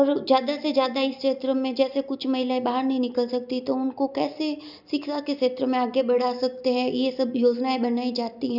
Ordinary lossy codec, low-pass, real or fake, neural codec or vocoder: none; 5.4 kHz; real; none